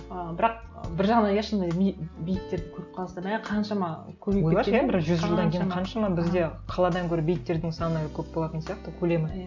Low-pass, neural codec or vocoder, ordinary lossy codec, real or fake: 7.2 kHz; none; none; real